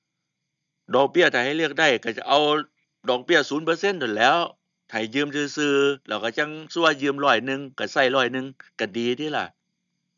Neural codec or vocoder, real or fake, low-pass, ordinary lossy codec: none; real; 7.2 kHz; none